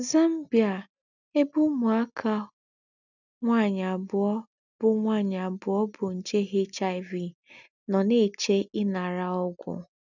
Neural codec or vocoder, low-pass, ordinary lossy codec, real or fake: none; 7.2 kHz; none; real